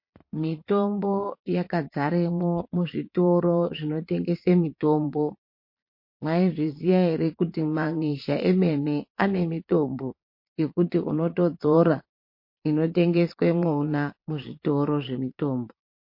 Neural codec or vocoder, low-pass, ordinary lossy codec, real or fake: vocoder, 22.05 kHz, 80 mel bands, Vocos; 5.4 kHz; MP3, 32 kbps; fake